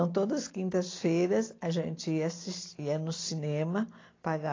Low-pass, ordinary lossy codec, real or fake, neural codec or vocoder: 7.2 kHz; AAC, 32 kbps; real; none